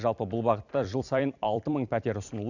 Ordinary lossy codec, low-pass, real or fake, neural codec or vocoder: none; 7.2 kHz; fake; vocoder, 44.1 kHz, 128 mel bands every 256 samples, BigVGAN v2